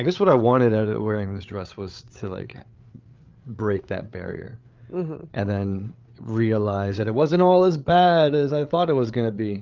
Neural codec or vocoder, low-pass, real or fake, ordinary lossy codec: codec, 16 kHz, 8 kbps, FreqCodec, larger model; 7.2 kHz; fake; Opus, 24 kbps